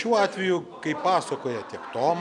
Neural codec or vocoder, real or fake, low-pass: none; real; 10.8 kHz